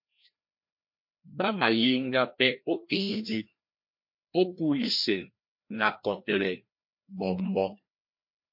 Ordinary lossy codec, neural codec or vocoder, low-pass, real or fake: MP3, 32 kbps; codec, 16 kHz, 1 kbps, FreqCodec, larger model; 5.4 kHz; fake